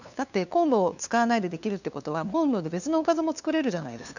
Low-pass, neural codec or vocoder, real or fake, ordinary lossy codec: 7.2 kHz; codec, 16 kHz, 2 kbps, FunCodec, trained on LibriTTS, 25 frames a second; fake; none